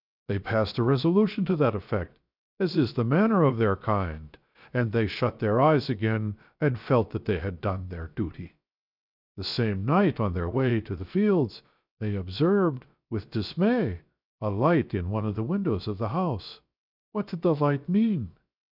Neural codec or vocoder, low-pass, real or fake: codec, 16 kHz, 0.7 kbps, FocalCodec; 5.4 kHz; fake